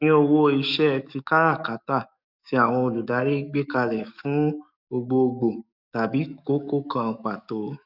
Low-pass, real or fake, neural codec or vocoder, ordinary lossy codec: 5.4 kHz; fake; codec, 44.1 kHz, 7.8 kbps, DAC; none